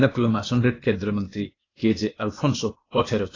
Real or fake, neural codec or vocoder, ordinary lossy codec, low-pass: fake; codec, 16 kHz, 0.8 kbps, ZipCodec; AAC, 32 kbps; 7.2 kHz